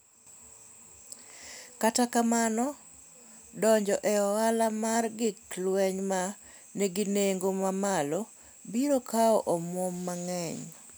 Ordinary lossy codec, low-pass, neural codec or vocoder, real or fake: none; none; none; real